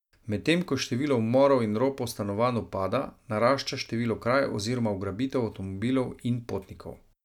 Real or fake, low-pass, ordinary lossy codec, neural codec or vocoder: real; 19.8 kHz; none; none